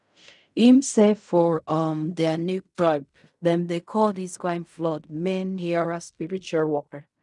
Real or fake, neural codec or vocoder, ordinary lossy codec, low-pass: fake; codec, 16 kHz in and 24 kHz out, 0.4 kbps, LongCat-Audio-Codec, fine tuned four codebook decoder; none; 10.8 kHz